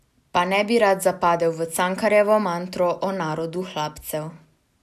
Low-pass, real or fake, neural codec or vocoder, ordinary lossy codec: 14.4 kHz; real; none; none